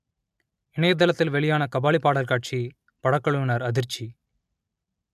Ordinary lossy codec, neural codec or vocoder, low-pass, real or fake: MP3, 96 kbps; none; 14.4 kHz; real